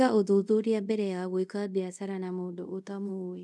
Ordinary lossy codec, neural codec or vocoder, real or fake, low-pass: none; codec, 24 kHz, 0.5 kbps, DualCodec; fake; none